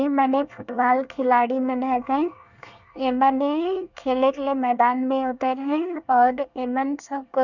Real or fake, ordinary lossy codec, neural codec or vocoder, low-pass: fake; none; codec, 24 kHz, 1 kbps, SNAC; 7.2 kHz